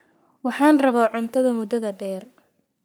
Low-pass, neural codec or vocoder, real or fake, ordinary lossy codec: none; codec, 44.1 kHz, 3.4 kbps, Pupu-Codec; fake; none